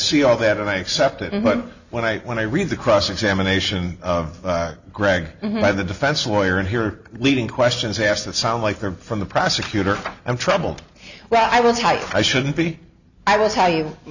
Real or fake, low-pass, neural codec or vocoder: real; 7.2 kHz; none